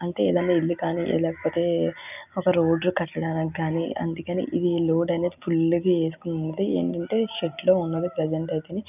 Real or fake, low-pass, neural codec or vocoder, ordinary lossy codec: real; 3.6 kHz; none; none